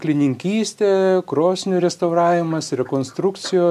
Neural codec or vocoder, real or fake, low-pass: none; real; 14.4 kHz